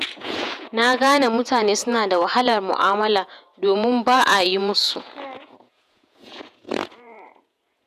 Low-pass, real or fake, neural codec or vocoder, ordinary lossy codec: 14.4 kHz; fake; vocoder, 48 kHz, 128 mel bands, Vocos; none